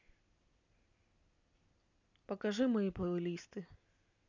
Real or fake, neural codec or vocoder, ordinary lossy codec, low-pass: real; none; none; 7.2 kHz